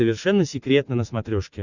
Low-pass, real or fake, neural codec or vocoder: 7.2 kHz; real; none